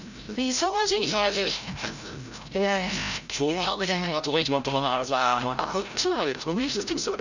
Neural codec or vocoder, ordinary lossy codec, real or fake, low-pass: codec, 16 kHz, 0.5 kbps, FreqCodec, larger model; none; fake; 7.2 kHz